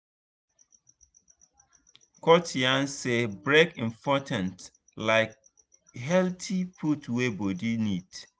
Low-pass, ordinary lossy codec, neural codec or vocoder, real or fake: none; none; none; real